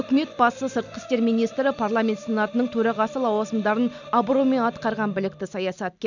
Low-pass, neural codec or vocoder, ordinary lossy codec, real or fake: 7.2 kHz; none; none; real